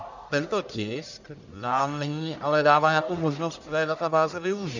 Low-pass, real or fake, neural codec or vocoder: 7.2 kHz; fake; codec, 44.1 kHz, 1.7 kbps, Pupu-Codec